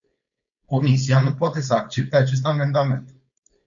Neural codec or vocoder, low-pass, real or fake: codec, 16 kHz, 4.8 kbps, FACodec; 7.2 kHz; fake